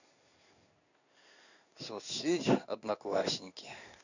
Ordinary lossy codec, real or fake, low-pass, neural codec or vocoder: none; fake; 7.2 kHz; codec, 16 kHz in and 24 kHz out, 1 kbps, XY-Tokenizer